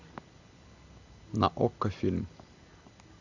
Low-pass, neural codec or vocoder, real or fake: 7.2 kHz; none; real